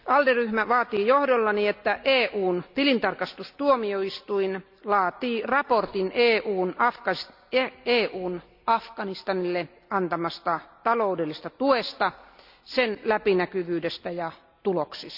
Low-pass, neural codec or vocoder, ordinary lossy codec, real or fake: 5.4 kHz; none; none; real